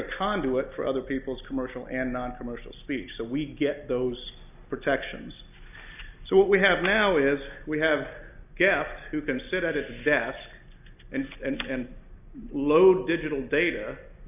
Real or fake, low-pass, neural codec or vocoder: real; 3.6 kHz; none